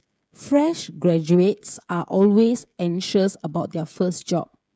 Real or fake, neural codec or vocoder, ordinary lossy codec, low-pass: fake; codec, 16 kHz, 16 kbps, FreqCodec, smaller model; none; none